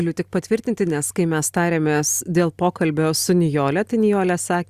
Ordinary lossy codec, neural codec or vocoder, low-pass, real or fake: Opus, 64 kbps; none; 14.4 kHz; real